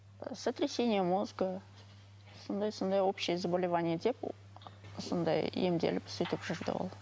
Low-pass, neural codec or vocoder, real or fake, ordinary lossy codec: none; none; real; none